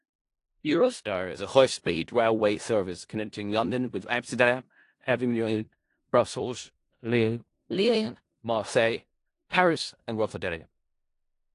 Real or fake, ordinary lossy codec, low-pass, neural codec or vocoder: fake; AAC, 48 kbps; 10.8 kHz; codec, 16 kHz in and 24 kHz out, 0.4 kbps, LongCat-Audio-Codec, four codebook decoder